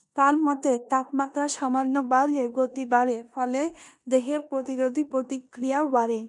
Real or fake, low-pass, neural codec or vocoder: fake; 10.8 kHz; codec, 16 kHz in and 24 kHz out, 0.9 kbps, LongCat-Audio-Codec, four codebook decoder